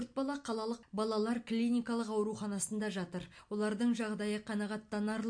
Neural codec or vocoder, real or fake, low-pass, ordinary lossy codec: none; real; 9.9 kHz; MP3, 48 kbps